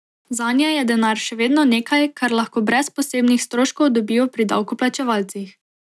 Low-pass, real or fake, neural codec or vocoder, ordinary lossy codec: none; real; none; none